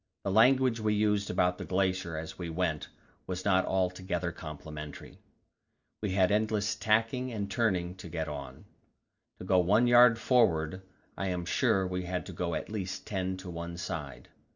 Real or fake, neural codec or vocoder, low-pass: real; none; 7.2 kHz